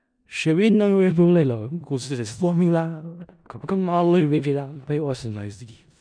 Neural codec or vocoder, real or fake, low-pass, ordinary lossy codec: codec, 16 kHz in and 24 kHz out, 0.4 kbps, LongCat-Audio-Codec, four codebook decoder; fake; 9.9 kHz; none